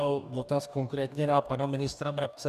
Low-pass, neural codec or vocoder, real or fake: 14.4 kHz; codec, 44.1 kHz, 2.6 kbps, DAC; fake